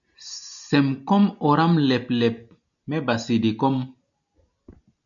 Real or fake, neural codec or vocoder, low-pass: real; none; 7.2 kHz